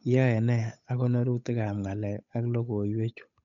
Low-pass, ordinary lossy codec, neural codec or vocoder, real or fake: 7.2 kHz; none; codec, 16 kHz, 8 kbps, FunCodec, trained on Chinese and English, 25 frames a second; fake